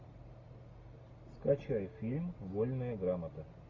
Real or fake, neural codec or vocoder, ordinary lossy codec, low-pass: real; none; AAC, 32 kbps; 7.2 kHz